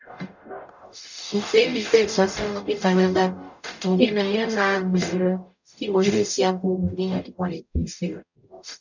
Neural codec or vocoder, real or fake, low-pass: codec, 44.1 kHz, 0.9 kbps, DAC; fake; 7.2 kHz